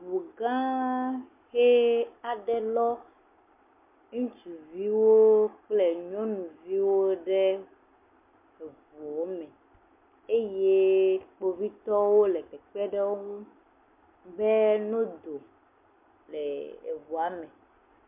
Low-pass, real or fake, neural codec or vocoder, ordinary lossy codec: 3.6 kHz; real; none; MP3, 32 kbps